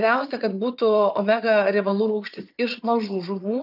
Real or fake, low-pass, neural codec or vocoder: fake; 5.4 kHz; vocoder, 22.05 kHz, 80 mel bands, Vocos